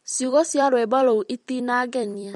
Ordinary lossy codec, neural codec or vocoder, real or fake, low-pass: MP3, 48 kbps; vocoder, 44.1 kHz, 128 mel bands every 256 samples, BigVGAN v2; fake; 19.8 kHz